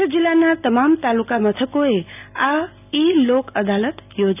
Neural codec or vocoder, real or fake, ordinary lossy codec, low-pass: none; real; none; 3.6 kHz